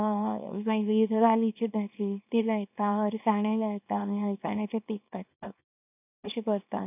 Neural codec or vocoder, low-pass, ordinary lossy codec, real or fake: codec, 24 kHz, 0.9 kbps, WavTokenizer, small release; 3.6 kHz; none; fake